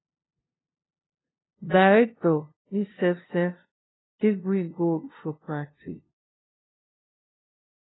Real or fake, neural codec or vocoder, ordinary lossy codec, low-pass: fake; codec, 16 kHz, 0.5 kbps, FunCodec, trained on LibriTTS, 25 frames a second; AAC, 16 kbps; 7.2 kHz